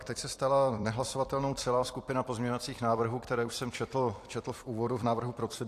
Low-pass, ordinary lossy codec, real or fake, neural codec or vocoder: 14.4 kHz; AAC, 64 kbps; fake; vocoder, 44.1 kHz, 128 mel bands every 256 samples, BigVGAN v2